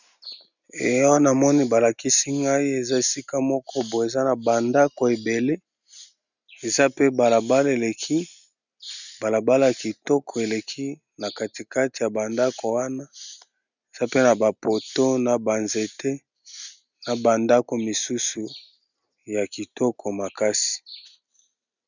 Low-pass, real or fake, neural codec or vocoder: 7.2 kHz; real; none